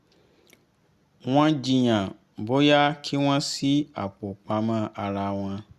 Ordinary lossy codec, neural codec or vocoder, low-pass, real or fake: Opus, 64 kbps; none; 14.4 kHz; real